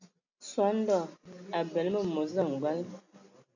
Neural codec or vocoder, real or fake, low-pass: none; real; 7.2 kHz